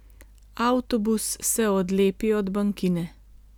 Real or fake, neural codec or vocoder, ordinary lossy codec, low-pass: real; none; none; none